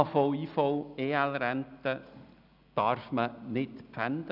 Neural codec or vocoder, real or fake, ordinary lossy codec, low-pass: none; real; none; 5.4 kHz